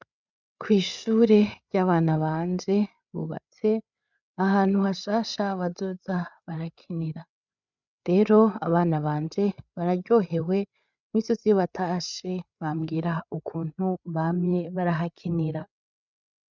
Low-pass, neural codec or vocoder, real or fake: 7.2 kHz; codec, 16 kHz, 8 kbps, FreqCodec, larger model; fake